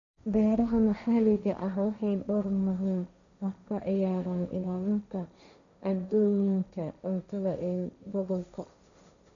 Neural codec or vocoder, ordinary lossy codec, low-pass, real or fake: codec, 16 kHz, 1.1 kbps, Voila-Tokenizer; none; 7.2 kHz; fake